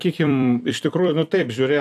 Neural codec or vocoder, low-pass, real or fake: vocoder, 44.1 kHz, 128 mel bands every 256 samples, BigVGAN v2; 14.4 kHz; fake